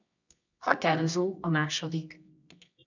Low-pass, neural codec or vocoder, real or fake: 7.2 kHz; codec, 24 kHz, 0.9 kbps, WavTokenizer, medium music audio release; fake